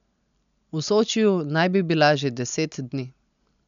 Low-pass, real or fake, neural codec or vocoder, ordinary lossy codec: 7.2 kHz; real; none; none